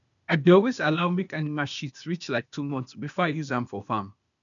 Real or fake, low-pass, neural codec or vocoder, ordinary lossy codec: fake; 7.2 kHz; codec, 16 kHz, 0.8 kbps, ZipCodec; none